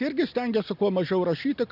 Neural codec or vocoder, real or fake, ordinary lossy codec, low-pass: none; real; AAC, 48 kbps; 5.4 kHz